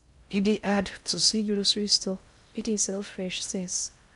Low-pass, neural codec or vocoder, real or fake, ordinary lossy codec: 10.8 kHz; codec, 16 kHz in and 24 kHz out, 0.6 kbps, FocalCodec, streaming, 4096 codes; fake; none